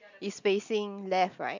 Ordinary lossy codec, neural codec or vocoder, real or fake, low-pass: none; none; real; 7.2 kHz